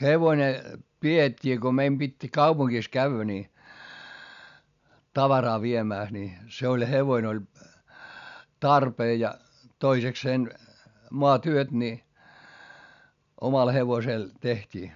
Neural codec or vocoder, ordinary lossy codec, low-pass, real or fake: none; none; 7.2 kHz; real